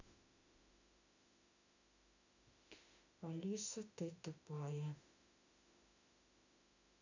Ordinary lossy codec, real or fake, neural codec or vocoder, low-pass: none; fake; autoencoder, 48 kHz, 32 numbers a frame, DAC-VAE, trained on Japanese speech; 7.2 kHz